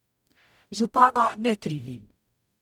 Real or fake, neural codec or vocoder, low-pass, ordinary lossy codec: fake; codec, 44.1 kHz, 0.9 kbps, DAC; 19.8 kHz; none